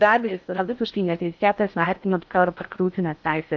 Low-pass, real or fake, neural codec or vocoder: 7.2 kHz; fake; codec, 16 kHz in and 24 kHz out, 0.6 kbps, FocalCodec, streaming, 4096 codes